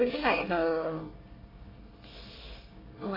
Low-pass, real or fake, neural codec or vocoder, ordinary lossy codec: 5.4 kHz; fake; codec, 24 kHz, 1 kbps, SNAC; AAC, 24 kbps